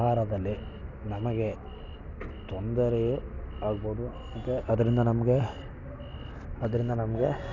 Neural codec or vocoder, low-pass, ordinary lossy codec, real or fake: none; 7.2 kHz; none; real